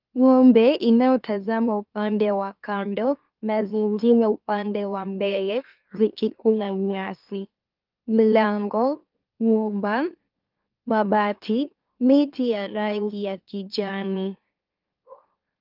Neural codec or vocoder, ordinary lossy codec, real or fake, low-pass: autoencoder, 44.1 kHz, a latent of 192 numbers a frame, MeloTTS; Opus, 24 kbps; fake; 5.4 kHz